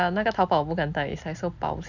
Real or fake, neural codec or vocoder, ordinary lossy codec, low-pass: real; none; none; 7.2 kHz